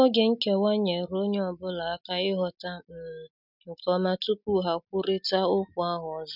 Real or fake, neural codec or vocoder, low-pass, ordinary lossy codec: real; none; 5.4 kHz; none